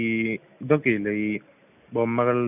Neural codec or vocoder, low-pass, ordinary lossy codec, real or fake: none; 3.6 kHz; none; real